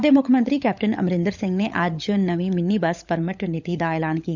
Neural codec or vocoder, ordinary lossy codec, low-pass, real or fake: codec, 16 kHz, 16 kbps, FunCodec, trained on LibriTTS, 50 frames a second; none; 7.2 kHz; fake